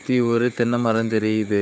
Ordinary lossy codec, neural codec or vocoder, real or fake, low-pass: none; codec, 16 kHz, 4 kbps, FunCodec, trained on Chinese and English, 50 frames a second; fake; none